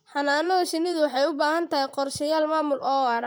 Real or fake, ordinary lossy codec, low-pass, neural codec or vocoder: fake; none; none; vocoder, 44.1 kHz, 128 mel bands, Pupu-Vocoder